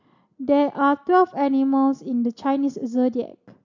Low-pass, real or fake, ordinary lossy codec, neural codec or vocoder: 7.2 kHz; real; none; none